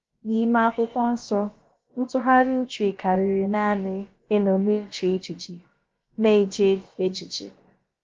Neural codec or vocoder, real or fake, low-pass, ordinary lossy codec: codec, 16 kHz, about 1 kbps, DyCAST, with the encoder's durations; fake; 7.2 kHz; Opus, 32 kbps